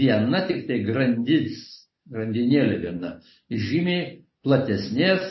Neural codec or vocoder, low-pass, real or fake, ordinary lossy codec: none; 7.2 kHz; real; MP3, 24 kbps